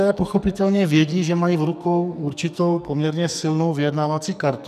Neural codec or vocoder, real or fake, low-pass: codec, 44.1 kHz, 2.6 kbps, SNAC; fake; 14.4 kHz